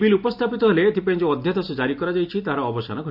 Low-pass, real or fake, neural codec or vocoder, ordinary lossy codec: 5.4 kHz; real; none; AAC, 48 kbps